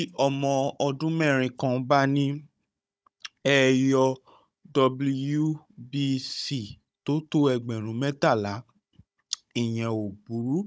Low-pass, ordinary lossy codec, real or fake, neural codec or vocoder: none; none; fake; codec, 16 kHz, 16 kbps, FunCodec, trained on Chinese and English, 50 frames a second